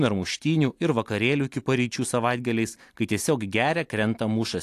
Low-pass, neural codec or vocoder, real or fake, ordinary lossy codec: 14.4 kHz; none; real; AAC, 64 kbps